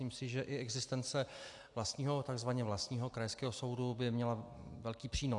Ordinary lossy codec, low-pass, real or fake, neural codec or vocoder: AAC, 64 kbps; 10.8 kHz; real; none